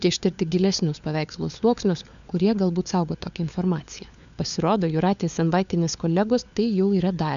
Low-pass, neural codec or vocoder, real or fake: 7.2 kHz; codec, 16 kHz, 4 kbps, FunCodec, trained on LibriTTS, 50 frames a second; fake